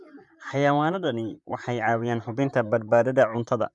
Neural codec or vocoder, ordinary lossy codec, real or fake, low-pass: vocoder, 44.1 kHz, 128 mel bands, Pupu-Vocoder; MP3, 96 kbps; fake; 10.8 kHz